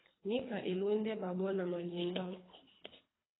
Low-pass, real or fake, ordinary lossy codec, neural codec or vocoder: 7.2 kHz; fake; AAC, 16 kbps; codec, 24 kHz, 3 kbps, HILCodec